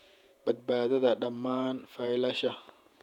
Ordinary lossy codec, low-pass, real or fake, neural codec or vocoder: none; 19.8 kHz; fake; vocoder, 48 kHz, 128 mel bands, Vocos